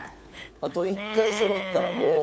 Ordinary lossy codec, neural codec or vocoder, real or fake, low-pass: none; codec, 16 kHz, 4 kbps, FunCodec, trained on LibriTTS, 50 frames a second; fake; none